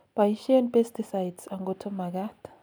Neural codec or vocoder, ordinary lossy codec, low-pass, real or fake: none; none; none; real